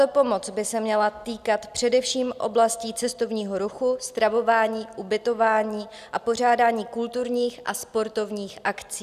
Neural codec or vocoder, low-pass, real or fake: none; 14.4 kHz; real